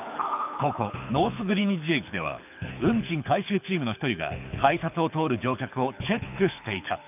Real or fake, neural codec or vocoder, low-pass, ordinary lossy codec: fake; codec, 24 kHz, 6 kbps, HILCodec; 3.6 kHz; none